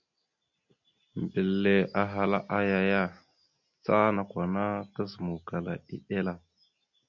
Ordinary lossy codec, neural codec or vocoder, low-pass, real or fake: MP3, 64 kbps; none; 7.2 kHz; real